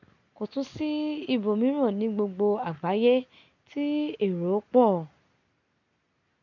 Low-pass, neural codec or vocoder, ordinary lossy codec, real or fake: 7.2 kHz; none; none; real